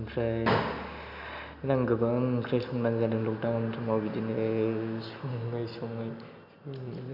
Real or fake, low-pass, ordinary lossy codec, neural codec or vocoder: real; 5.4 kHz; none; none